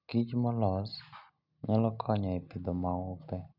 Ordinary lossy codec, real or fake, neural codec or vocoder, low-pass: none; real; none; 5.4 kHz